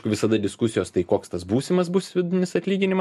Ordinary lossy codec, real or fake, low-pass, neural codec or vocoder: MP3, 64 kbps; real; 14.4 kHz; none